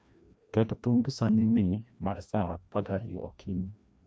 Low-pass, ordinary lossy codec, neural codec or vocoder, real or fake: none; none; codec, 16 kHz, 1 kbps, FreqCodec, larger model; fake